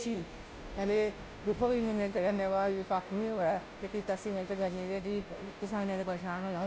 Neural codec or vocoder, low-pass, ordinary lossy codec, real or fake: codec, 16 kHz, 0.5 kbps, FunCodec, trained on Chinese and English, 25 frames a second; none; none; fake